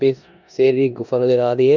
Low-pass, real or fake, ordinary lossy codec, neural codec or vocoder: 7.2 kHz; fake; none; codec, 16 kHz in and 24 kHz out, 0.9 kbps, LongCat-Audio-Codec, four codebook decoder